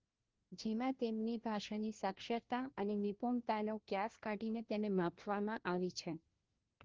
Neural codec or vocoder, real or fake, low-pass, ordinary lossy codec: codec, 16 kHz, 1 kbps, FunCodec, trained on LibriTTS, 50 frames a second; fake; 7.2 kHz; Opus, 16 kbps